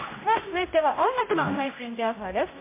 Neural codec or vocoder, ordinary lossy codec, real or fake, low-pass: codec, 16 kHz, 0.5 kbps, X-Codec, HuBERT features, trained on general audio; MP3, 24 kbps; fake; 3.6 kHz